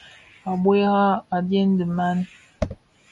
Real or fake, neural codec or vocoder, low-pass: real; none; 10.8 kHz